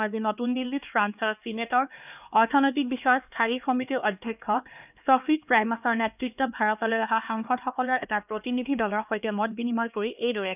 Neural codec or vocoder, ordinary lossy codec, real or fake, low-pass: codec, 16 kHz, 2 kbps, X-Codec, HuBERT features, trained on LibriSpeech; none; fake; 3.6 kHz